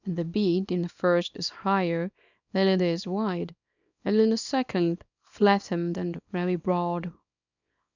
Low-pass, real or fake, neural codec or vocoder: 7.2 kHz; fake; codec, 24 kHz, 0.9 kbps, WavTokenizer, small release